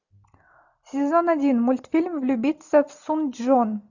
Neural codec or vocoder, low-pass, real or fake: none; 7.2 kHz; real